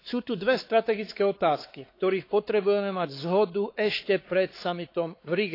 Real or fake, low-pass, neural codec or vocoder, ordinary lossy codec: fake; 5.4 kHz; codec, 16 kHz, 4 kbps, X-Codec, WavLM features, trained on Multilingual LibriSpeech; AAC, 32 kbps